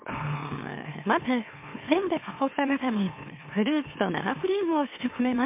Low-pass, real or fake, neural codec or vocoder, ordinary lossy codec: 3.6 kHz; fake; autoencoder, 44.1 kHz, a latent of 192 numbers a frame, MeloTTS; MP3, 24 kbps